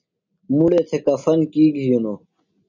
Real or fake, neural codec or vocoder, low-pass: real; none; 7.2 kHz